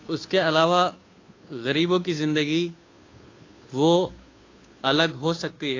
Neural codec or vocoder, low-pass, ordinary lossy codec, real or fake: codec, 16 kHz, 2 kbps, FunCodec, trained on Chinese and English, 25 frames a second; 7.2 kHz; AAC, 32 kbps; fake